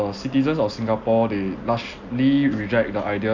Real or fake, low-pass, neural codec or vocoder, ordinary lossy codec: real; 7.2 kHz; none; AAC, 48 kbps